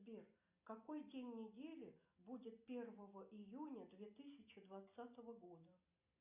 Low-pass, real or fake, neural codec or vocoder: 3.6 kHz; real; none